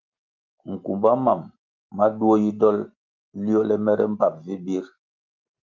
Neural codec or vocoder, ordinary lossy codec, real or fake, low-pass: none; Opus, 32 kbps; real; 7.2 kHz